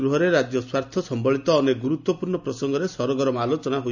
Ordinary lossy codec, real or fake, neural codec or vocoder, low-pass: none; real; none; 7.2 kHz